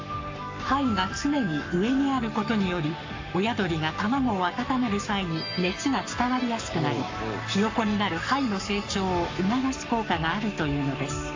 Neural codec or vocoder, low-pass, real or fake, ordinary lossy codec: codec, 44.1 kHz, 7.8 kbps, Pupu-Codec; 7.2 kHz; fake; AAC, 48 kbps